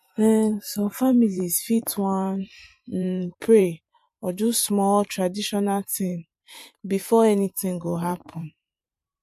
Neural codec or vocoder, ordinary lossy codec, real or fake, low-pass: vocoder, 44.1 kHz, 128 mel bands every 512 samples, BigVGAN v2; MP3, 64 kbps; fake; 14.4 kHz